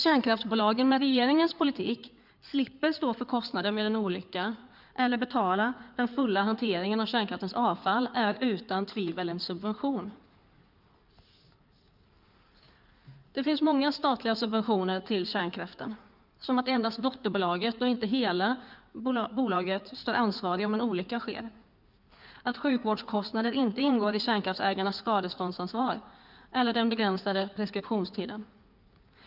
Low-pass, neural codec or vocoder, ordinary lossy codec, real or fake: 5.4 kHz; codec, 16 kHz in and 24 kHz out, 2.2 kbps, FireRedTTS-2 codec; none; fake